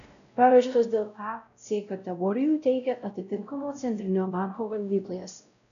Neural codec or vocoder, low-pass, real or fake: codec, 16 kHz, 0.5 kbps, X-Codec, WavLM features, trained on Multilingual LibriSpeech; 7.2 kHz; fake